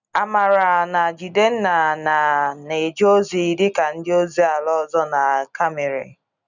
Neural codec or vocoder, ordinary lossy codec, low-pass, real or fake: none; none; 7.2 kHz; real